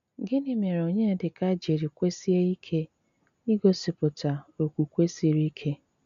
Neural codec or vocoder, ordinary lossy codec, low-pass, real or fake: none; none; 7.2 kHz; real